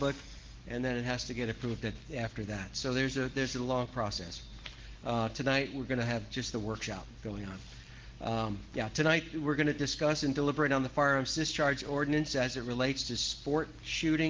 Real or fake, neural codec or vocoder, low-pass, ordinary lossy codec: real; none; 7.2 kHz; Opus, 16 kbps